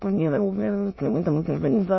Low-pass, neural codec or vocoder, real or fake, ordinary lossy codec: 7.2 kHz; autoencoder, 22.05 kHz, a latent of 192 numbers a frame, VITS, trained on many speakers; fake; MP3, 24 kbps